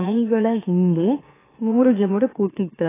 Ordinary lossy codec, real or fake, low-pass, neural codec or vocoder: AAC, 16 kbps; fake; 3.6 kHz; autoencoder, 44.1 kHz, a latent of 192 numbers a frame, MeloTTS